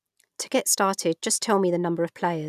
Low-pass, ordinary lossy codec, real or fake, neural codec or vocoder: 14.4 kHz; none; real; none